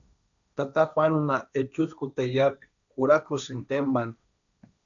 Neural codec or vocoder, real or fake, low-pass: codec, 16 kHz, 1.1 kbps, Voila-Tokenizer; fake; 7.2 kHz